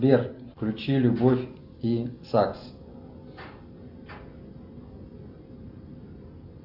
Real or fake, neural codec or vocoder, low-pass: real; none; 5.4 kHz